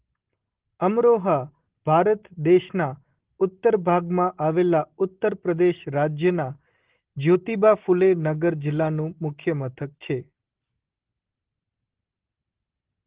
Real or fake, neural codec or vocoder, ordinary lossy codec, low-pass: real; none; Opus, 16 kbps; 3.6 kHz